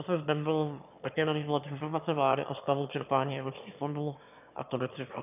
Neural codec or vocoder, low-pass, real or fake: autoencoder, 22.05 kHz, a latent of 192 numbers a frame, VITS, trained on one speaker; 3.6 kHz; fake